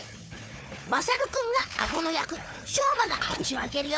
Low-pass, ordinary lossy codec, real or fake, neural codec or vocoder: none; none; fake; codec, 16 kHz, 4 kbps, FunCodec, trained on Chinese and English, 50 frames a second